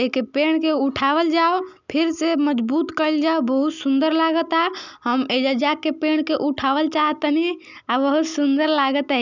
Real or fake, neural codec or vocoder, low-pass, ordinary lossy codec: real; none; 7.2 kHz; none